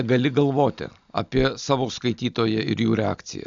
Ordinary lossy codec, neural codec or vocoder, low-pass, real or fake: AAC, 64 kbps; none; 7.2 kHz; real